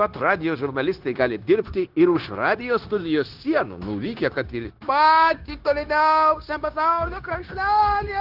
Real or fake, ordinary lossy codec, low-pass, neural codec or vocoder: fake; Opus, 16 kbps; 5.4 kHz; codec, 16 kHz, 0.9 kbps, LongCat-Audio-Codec